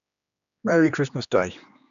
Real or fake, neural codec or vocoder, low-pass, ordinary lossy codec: fake; codec, 16 kHz, 2 kbps, X-Codec, HuBERT features, trained on general audio; 7.2 kHz; none